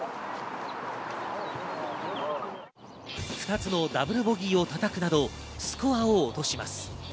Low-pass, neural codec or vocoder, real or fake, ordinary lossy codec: none; none; real; none